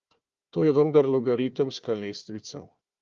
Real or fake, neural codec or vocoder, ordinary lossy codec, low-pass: fake; codec, 16 kHz, 1 kbps, FunCodec, trained on Chinese and English, 50 frames a second; Opus, 32 kbps; 7.2 kHz